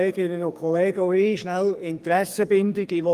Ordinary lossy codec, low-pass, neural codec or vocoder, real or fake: Opus, 32 kbps; 14.4 kHz; codec, 44.1 kHz, 2.6 kbps, SNAC; fake